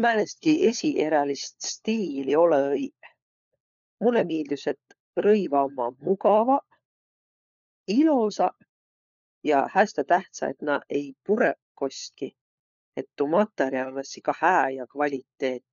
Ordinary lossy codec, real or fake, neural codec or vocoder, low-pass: none; fake; codec, 16 kHz, 4 kbps, FunCodec, trained on LibriTTS, 50 frames a second; 7.2 kHz